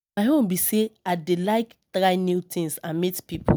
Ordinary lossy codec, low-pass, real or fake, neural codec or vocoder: none; none; real; none